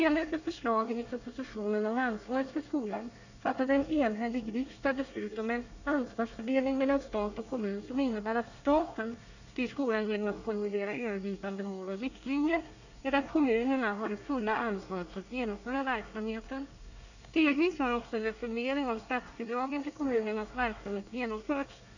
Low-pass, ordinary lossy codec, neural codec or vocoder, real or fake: 7.2 kHz; none; codec, 24 kHz, 1 kbps, SNAC; fake